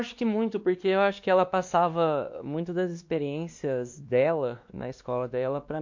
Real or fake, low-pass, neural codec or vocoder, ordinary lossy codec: fake; 7.2 kHz; codec, 16 kHz, 2 kbps, X-Codec, WavLM features, trained on Multilingual LibriSpeech; MP3, 48 kbps